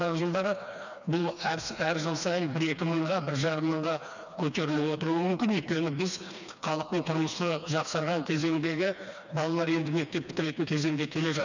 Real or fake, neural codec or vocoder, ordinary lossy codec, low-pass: fake; codec, 16 kHz, 2 kbps, FreqCodec, smaller model; none; 7.2 kHz